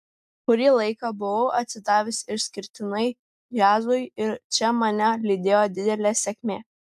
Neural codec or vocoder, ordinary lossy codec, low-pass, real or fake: none; AAC, 96 kbps; 14.4 kHz; real